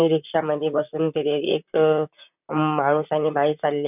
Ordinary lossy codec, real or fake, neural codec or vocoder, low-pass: none; fake; vocoder, 44.1 kHz, 128 mel bands, Pupu-Vocoder; 3.6 kHz